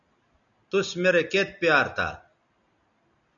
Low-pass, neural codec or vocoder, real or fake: 7.2 kHz; none; real